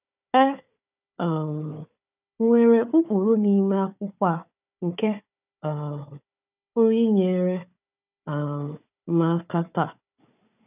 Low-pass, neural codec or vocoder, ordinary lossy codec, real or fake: 3.6 kHz; codec, 16 kHz, 4 kbps, FunCodec, trained on Chinese and English, 50 frames a second; none; fake